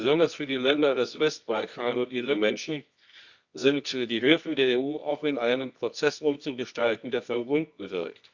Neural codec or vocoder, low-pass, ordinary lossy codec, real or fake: codec, 24 kHz, 0.9 kbps, WavTokenizer, medium music audio release; 7.2 kHz; Opus, 64 kbps; fake